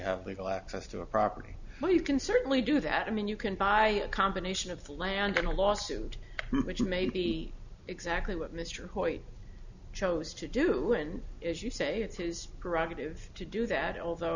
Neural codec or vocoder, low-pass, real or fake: none; 7.2 kHz; real